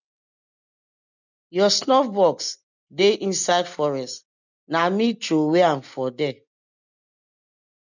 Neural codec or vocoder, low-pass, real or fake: none; 7.2 kHz; real